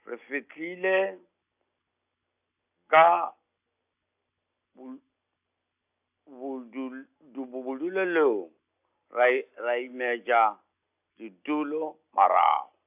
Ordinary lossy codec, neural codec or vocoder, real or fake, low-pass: AAC, 32 kbps; none; real; 3.6 kHz